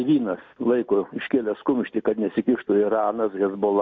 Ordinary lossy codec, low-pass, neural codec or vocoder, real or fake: MP3, 48 kbps; 7.2 kHz; none; real